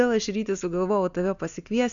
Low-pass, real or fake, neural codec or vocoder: 7.2 kHz; real; none